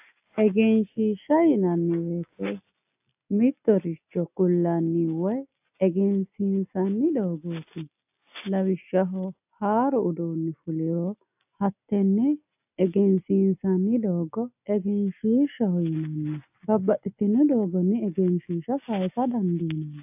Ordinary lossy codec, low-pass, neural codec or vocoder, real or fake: AAC, 32 kbps; 3.6 kHz; none; real